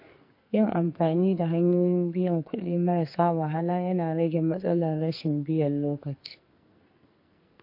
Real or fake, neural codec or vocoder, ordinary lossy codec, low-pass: fake; codec, 44.1 kHz, 2.6 kbps, SNAC; MP3, 32 kbps; 5.4 kHz